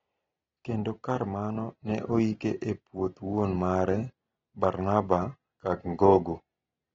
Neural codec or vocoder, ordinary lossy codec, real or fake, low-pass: none; AAC, 24 kbps; real; 7.2 kHz